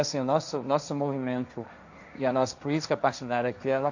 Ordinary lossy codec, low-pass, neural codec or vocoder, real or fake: none; 7.2 kHz; codec, 16 kHz, 1.1 kbps, Voila-Tokenizer; fake